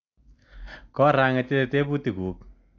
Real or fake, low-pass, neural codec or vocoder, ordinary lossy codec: real; 7.2 kHz; none; AAC, 48 kbps